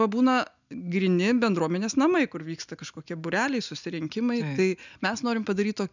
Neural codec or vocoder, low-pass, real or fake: none; 7.2 kHz; real